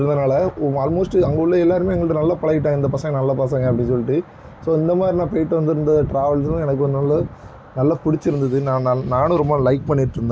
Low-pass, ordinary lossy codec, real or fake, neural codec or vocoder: none; none; real; none